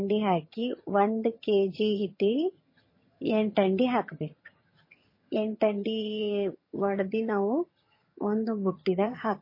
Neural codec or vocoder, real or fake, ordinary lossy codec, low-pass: vocoder, 22.05 kHz, 80 mel bands, HiFi-GAN; fake; MP3, 24 kbps; 5.4 kHz